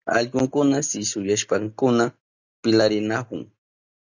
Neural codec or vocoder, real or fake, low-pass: none; real; 7.2 kHz